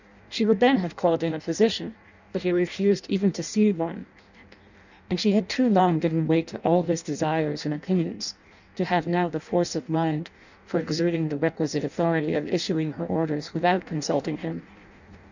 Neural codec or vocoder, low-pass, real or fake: codec, 16 kHz in and 24 kHz out, 0.6 kbps, FireRedTTS-2 codec; 7.2 kHz; fake